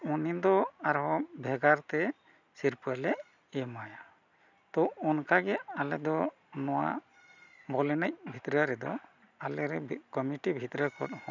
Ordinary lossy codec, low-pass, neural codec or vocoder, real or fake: none; 7.2 kHz; none; real